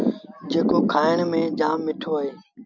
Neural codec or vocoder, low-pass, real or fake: none; 7.2 kHz; real